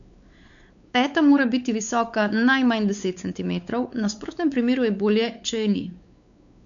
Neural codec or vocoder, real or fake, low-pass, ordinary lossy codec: codec, 16 kHz, 4 kbps, X-Codec, WavLM features, trained on Multilingual LibriSpeech; fake; 7.2 kHz; none